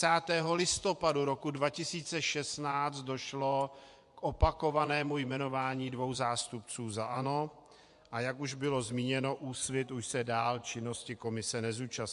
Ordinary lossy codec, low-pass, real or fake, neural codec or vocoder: MP3, 64 kbps; 10.8 kHz; fake; vocoder, 24 kHz, 100 mel bands, Vocos